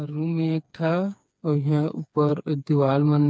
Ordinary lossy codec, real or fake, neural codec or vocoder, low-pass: none; fake; codec, 16 kHz, 4 kbps, FreqCodec, smaller model; none